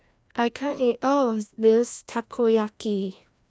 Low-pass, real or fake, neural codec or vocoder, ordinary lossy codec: none; fake; codec, 16 kHz, 1 kbps, FreqCodec, larger model; none